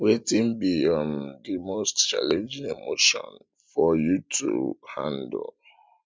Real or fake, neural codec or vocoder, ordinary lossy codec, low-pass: real; none; none; none